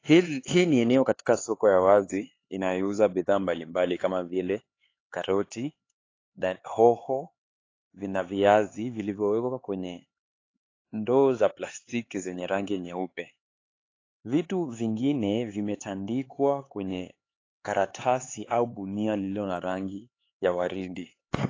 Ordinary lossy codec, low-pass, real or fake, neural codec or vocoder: AAC, 32 kbps; 7.2 kHz; fake; codec, 16 kHz, 4 kbps, X-Codec, HuBERT features, trained on LibriSpeech